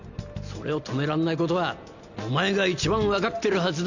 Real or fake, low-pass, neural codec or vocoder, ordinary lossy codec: real; 7.2 kHz; none; none